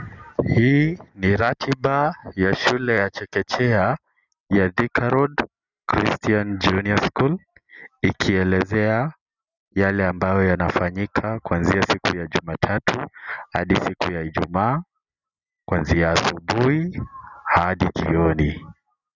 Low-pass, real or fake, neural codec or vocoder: 7.2 kHz; real; none